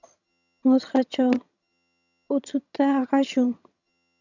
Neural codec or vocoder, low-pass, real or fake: vocoder, 22.05 kHz, 80 mel bands, HiFi-GAN; 7.2 kHz; fake